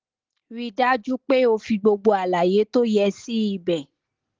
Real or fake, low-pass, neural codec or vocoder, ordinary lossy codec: real; 7.2 kHz; none; Opus, 16 kbps